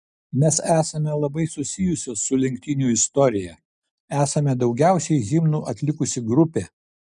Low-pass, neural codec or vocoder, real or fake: 10.8 kHz; none; real